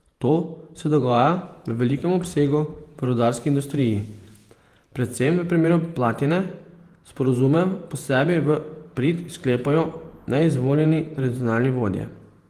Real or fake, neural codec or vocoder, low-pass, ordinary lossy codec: fake; vocoder, 48 kHz, 128 mel bands, Vocos; 14.4 kHz; Opus, 24 kbps